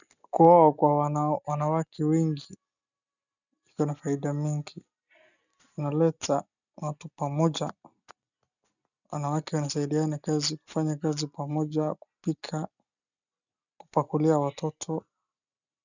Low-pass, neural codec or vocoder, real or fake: 7.2 kHz; none; real